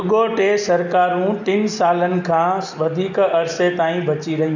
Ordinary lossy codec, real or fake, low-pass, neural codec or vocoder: none; real; 7.2 kHz; none